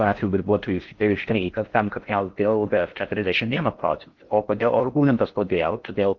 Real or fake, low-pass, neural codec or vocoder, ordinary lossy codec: fake; 7.2 kHz; codec, 16 kHz in and 24 kHz out, 0.6 kbps, FocalCodec, streaming, 4096 codes; Opus, 24 kbps